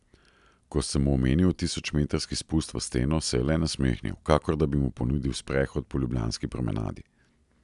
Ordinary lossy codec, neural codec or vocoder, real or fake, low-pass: none; none; real; 10.8 kHz